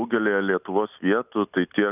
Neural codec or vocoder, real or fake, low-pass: none; real; 3.6 kHz